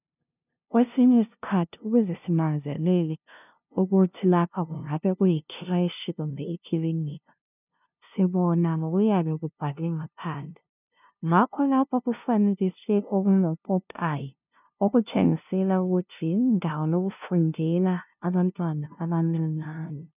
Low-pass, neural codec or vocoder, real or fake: 3.6 kHz; codec, 16 kHz, 0.5 kbps, FunCodec, trained on LibriTTS, 25 frames a second; fake